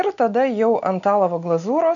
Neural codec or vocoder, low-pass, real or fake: none; 7.2 kHz; real